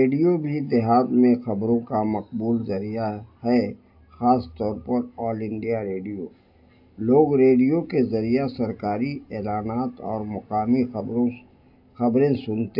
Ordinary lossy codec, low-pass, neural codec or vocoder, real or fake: AAC, 48 kbps; 5.4 kHz; none; real